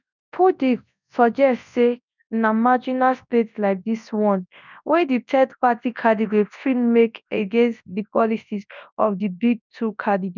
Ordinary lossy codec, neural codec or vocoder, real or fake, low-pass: none; codec, 24 kHz, 0.9 kbps, WavTokenizer, large speech release; fake; 7.2 kHz